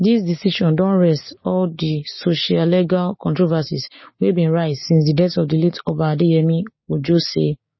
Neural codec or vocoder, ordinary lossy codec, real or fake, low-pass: codec, 16 kHz, 6 kbps, DAC; MP3, 24 kbps; fake; 7.2 kHz